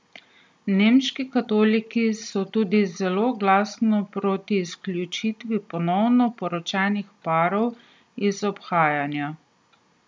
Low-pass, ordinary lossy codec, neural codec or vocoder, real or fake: none; none; none; real